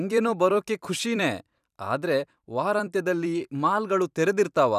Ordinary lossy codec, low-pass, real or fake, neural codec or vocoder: none; 14.4 kHz; fake; vocoder, 44.1 kHz, 128 mel bands every 256 samples, BigVGAN v2